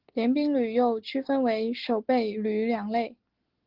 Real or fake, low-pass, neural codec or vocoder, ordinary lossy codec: real; 5.4 kHz; none; Opus, 16 kbps